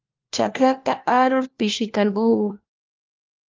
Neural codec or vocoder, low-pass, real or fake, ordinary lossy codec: codec, 16 kHz, 1 kbps, FunCodec, trained on LibriTTS, 50 frames a second; 7.2 kHz; fake; Opus, 32 kbps